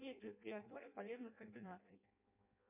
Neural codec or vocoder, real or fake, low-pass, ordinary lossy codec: codec, 16 kHz in and 24 kHz out, 0.6 kbps, FireRedTTS-2 codec; fake; 3.6 kHz; MP3, 32 kbps